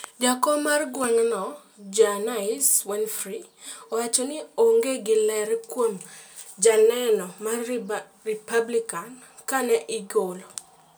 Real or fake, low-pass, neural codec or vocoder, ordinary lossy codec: real; none; none; none